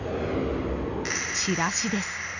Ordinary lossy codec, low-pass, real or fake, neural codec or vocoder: none; 7.2 kHz; real; none